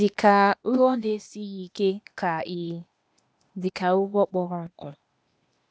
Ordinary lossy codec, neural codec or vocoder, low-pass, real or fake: none; codec, 16 kHz, 0.8 kbps, ZipCodec; none; fake